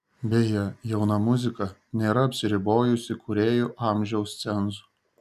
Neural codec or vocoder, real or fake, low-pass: none; real; 14.4 kHz